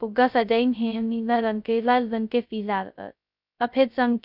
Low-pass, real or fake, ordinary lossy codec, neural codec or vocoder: 5.4 kHz; fake; none; codec, 16 kHz, 0.2 kbps, FocalCodec